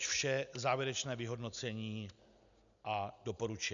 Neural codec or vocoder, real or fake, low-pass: none; real; 7.2 kHz